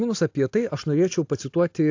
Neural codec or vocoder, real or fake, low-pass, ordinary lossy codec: none; real; 7.2 kHz; AAC, 48 kbps